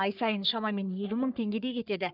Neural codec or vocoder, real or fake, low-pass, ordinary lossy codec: codec, 44.1 kHz, 3.4 kbps, Pupu-Codec; fake; 5.4 kHz; none